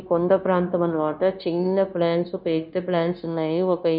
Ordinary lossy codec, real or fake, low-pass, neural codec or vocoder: none; fake; 5.4 kHz; codec, 16 kHz, 0.9 kbps, LongCat-Audio-Codec